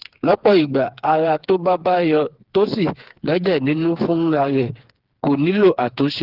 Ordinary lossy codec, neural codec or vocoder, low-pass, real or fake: Opus, 16 kbps; codec, 16 kHz, 4 kbps, FreqCodec, smaller model; 5.4 kHz; fake